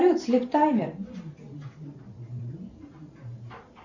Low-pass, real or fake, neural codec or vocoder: 7.2 kHz; fake; vocoder, 44.1 kHz, 128 mel bands every 512 samples, BigVGAN v2